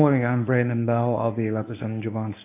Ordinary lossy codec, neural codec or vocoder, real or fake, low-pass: AAC, 24 kbps; codec, 24 kHz, 0.9 kbps, WavTokenizer, medium speech release version 1; fake; 3.6 kHz